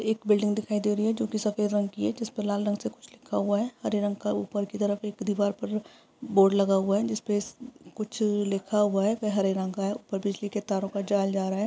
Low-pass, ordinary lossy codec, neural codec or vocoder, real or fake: none; none; none; real